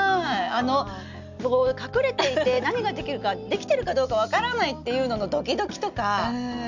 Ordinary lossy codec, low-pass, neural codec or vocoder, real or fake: none; 7.2 kHz; none; real